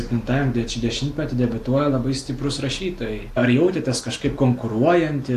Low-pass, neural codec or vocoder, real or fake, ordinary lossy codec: 14.4 kHz; none; real; AAC, 64 kbps